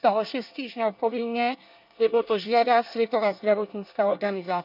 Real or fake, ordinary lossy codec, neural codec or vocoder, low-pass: fake; none; codec, 24 kHz, 1 kbps, SNAC; 5.4 kHz